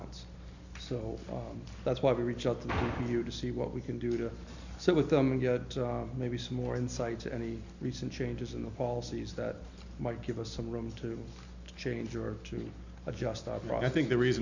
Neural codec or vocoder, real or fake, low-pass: none; real; 7.2 kHz